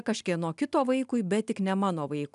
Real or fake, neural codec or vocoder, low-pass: real; none; 10.8 kHz